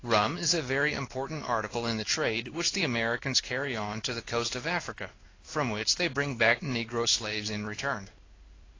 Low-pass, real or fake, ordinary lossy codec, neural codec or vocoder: 7.2 kHz; fake; AAC, 32 kbps; codec, 16 kHz in and 24 kHz out, 1 kbps, XY-Tokenizer